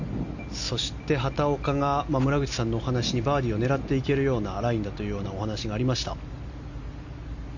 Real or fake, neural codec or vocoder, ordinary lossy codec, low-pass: real; none; none; 7.2 kHz